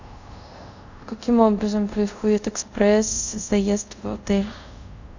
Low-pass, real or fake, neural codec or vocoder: 7.2 kHz; fake; codec, 24 kHz, 0.5 kbps, DualCodec